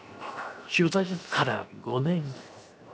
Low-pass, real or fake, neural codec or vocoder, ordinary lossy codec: none; fake; codec, 16 kHz, 0.7 kbps, FocalCodec; none